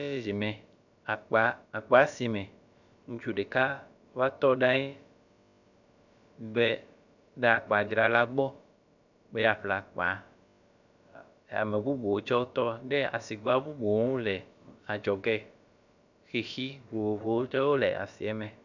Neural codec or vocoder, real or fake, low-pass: codec, 16 kHz, about 1 kbps, DyCAST, with the encoder's durations; fake; 7.2 kHz